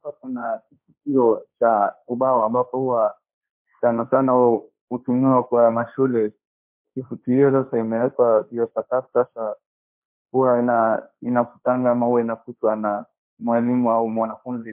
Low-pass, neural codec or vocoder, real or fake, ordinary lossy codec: 3.6 kHz; codec, 16 kHz, 1.1 kbps, Voila-Tokenizer; fake; MP3, 32 kbps